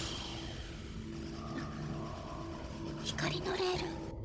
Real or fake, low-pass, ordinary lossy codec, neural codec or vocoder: fake; none; none; codec, 16 kHz, 16 kbps, FunCodec, trained on Chinese and English, 50 frames a second